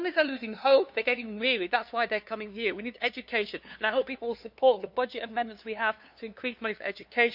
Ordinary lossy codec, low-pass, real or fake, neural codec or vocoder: none; 5.4 kHz; fake; codec, 16 kHz, 2 kbps, FunCodec, trained on LibriTTS, 25 frames a second